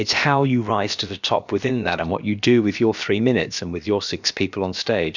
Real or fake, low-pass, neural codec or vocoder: fake; 7.2 kHz; codec, 16 kHz, about 1 kbps, DyCAST, with the encoder's durations